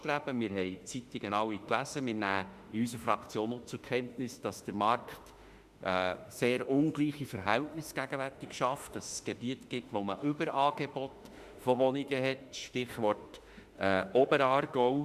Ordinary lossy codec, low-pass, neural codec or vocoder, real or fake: Opus, 64 kbps; 14.4 kHz; autoencoder, 48 kHz, 32 numbers a frame, DAC-VAE, trained on Japanese speech; fake